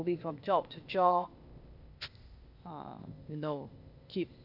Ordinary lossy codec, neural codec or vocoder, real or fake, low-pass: none; codec, 16 kHz, 0.8 kbps, ZipCodec; fake; 5.4 kHz